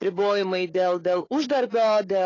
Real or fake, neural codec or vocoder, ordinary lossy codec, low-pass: fake; codec, 16 kHz, 4 kbps, FunCodec, trained on Chinese and English, 50 frames a second; AAC, 32 kbps; 7.2 kHz